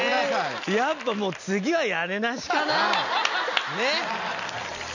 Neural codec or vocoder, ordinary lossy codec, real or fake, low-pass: none; none; real; 7.2 kHz